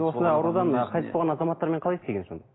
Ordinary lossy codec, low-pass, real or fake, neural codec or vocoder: AAC, 16 kbps; 7.2 kHz; real; none